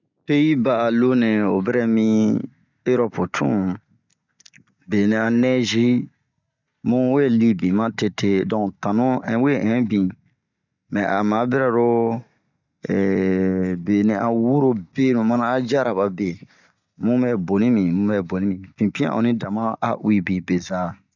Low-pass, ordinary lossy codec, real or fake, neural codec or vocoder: 7.2 kHz; none; real; none